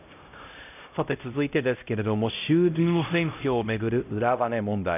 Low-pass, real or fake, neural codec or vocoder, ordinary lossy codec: 3.6 kHz; fake; codec, 16 kHz, 0.5 kbps, X-Codec, HuBERT features, trained on LibriSpeech; none